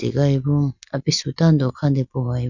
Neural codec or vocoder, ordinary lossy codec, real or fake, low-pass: none; none; real; 7.2 kHz